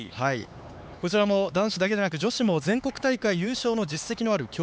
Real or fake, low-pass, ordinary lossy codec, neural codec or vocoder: fake; none; none; codec, 16 kHz, 4 kbps, X-Codec, HuBERT features, trained on LibriSpeech